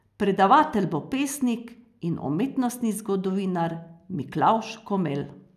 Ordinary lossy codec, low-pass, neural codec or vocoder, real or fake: none; 14.4 kHz; none; real